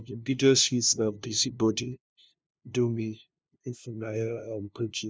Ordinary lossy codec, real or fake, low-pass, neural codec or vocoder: none; fake; none; codec, 16 kHz, 0.5 kbps, FunCodec, trained on LibriTTS, 25 frames a second